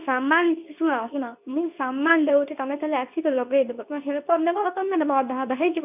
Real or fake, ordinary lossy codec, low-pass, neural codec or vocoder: fake; none; 3.6 kHz; codec, 24 kHz, 0.9 kbps, WavTokenizer, medium speech release version 2